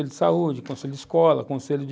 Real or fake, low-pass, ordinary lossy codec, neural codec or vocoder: real; none; none; none